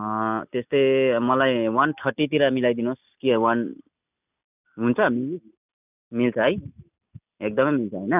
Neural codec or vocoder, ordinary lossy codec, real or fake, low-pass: none; none; real; 3.6 kHz